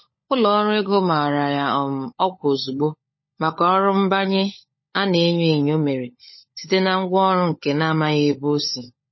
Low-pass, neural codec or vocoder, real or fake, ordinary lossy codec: 7.2 kHz; codec, 16 kHz, 16 kbps, FunCodec, trained on LibriTTS, 50 frames a second; fake; MP3, 24 kbps